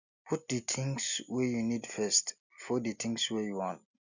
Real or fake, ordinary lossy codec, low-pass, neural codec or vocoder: real; none; 7.2 kHz; none